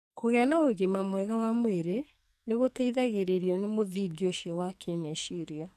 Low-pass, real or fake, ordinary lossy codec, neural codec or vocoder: 14.4 kHz; fake; none; codec, 44.1 kHz, 2.6 kbps, SNAC